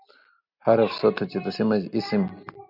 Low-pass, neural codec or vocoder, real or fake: 5.4 kHz; none; real